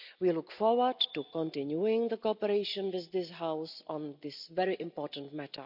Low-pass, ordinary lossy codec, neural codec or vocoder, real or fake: 5.4 kHz; none; none; real